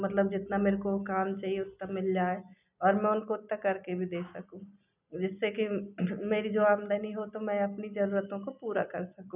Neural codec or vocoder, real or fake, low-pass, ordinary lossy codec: none; real; 3.6 kHz; none